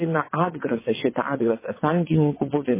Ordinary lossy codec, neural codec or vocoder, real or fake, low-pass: MP3, 16 kbps; vocoder, 22.05 kHz, 80 mel bands, WaveNeXt; fake; 3.6 kHz